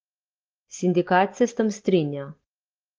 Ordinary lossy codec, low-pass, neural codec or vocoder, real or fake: Opus, 16 kbps; 7.2 kHz; none; real